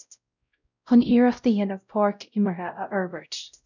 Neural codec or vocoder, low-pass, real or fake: codec, 16 kHz, 0.5 kbps, X-Codec, WavLM features, trained on Multilingual LibriSpeech; 7.2 kHz; fake